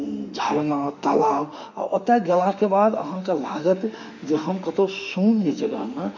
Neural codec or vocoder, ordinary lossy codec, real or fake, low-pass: autoencoder, 48 kHz, 32 numbers a frame, DAC-VAE, trained on Japanese speech; none; fake; 7.2 kHz